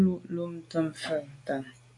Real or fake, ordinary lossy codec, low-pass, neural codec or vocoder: real; AAC, 64 kbps; 10.8 kHz; none